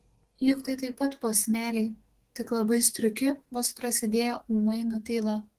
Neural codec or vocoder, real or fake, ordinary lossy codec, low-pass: codec, 32 kHz, 1.9 kbps, SNAC; fake; Opus, 16 kbps; 14.4 kHz